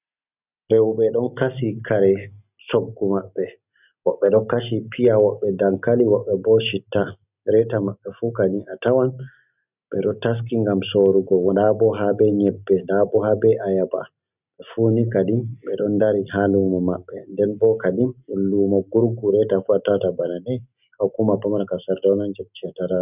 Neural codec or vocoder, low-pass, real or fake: none; 3.6 kHz; real